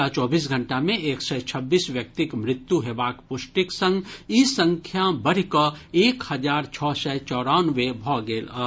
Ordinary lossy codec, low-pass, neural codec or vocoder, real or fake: none; none; none; real